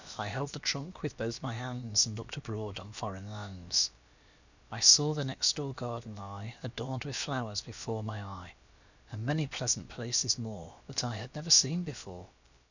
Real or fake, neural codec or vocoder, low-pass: fake; codec, 16 kHz, about 1 kbps, DyCAST, with the encoder's durations; 7.2 kHz